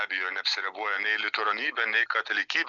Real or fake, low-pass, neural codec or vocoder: real; 7.2 kHz; none